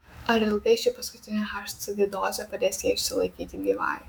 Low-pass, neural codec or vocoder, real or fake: 19.8 kHz; autoencoder, 48 kHz, 128 numbers a frame, DAC-VAE, trained on Japanese speech; fake